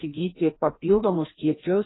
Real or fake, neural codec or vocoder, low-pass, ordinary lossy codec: fake; codec, 16 kHz, 1 kbps, FreqCodec, larger model; 7.2 kHz; AAC, 16 kbps